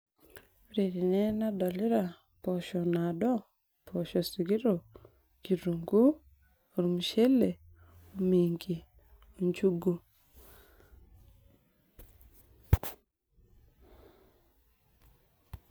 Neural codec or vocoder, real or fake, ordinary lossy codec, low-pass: none; real; none; none